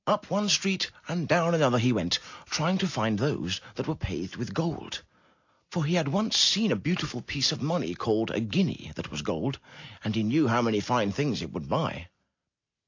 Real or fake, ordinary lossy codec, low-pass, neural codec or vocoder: real; AAC, 48 kbps; 7.2 kHz; none